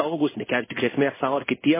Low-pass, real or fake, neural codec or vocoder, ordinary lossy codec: 3.6 kHz; fake; codec, 16 kHz in and 24 kHz out, 1 kbps, XY-Tokenizer; MP3, 16 kbps